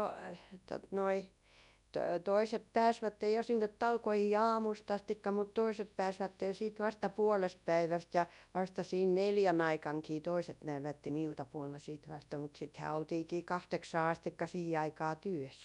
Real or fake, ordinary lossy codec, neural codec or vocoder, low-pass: fake; none; codec, 24 kHz, 0.9 kbps, WavTokenizer, large speech release; 10.8 kHz